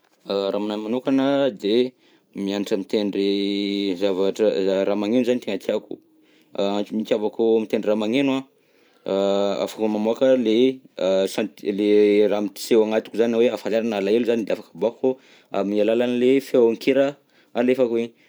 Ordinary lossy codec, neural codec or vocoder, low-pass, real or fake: none; vocoder, 44.1 kHz, 128 mel bands every 512 samples, BigVGAN v2; none; fake